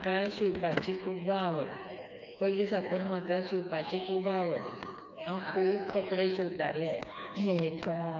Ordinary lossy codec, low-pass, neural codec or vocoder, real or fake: MP3, 64 kbps; 7.2 kHz; codec, 16 kHz, 2 kbps, FreqCodec, smaller model; fake